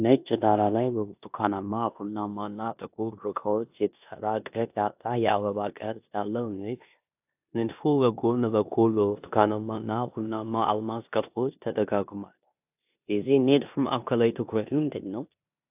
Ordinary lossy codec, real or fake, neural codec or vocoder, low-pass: AAC, 32 kbps; fake; codec, 16 kHz in and 24 kHz out, 0.9 kbps, LongCat-Audio-Codec, four codebook decoder; 3.6 kHz